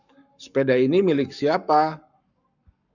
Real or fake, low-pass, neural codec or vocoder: fake; 7.2 kHz; codec, 44.1 kHz, 7.8 kbps, Pupu-Codec